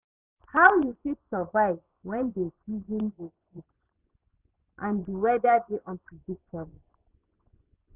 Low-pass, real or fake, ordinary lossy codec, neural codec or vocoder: 3.6 kHz; fake; none; vocoder, 24 kHz, 100 mel bands, Vocos